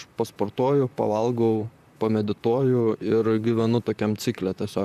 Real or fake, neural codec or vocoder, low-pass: fake; vocoder, 44.1 kHz, 128 mel bands, Pupu-Vocoder; 14.4 kHz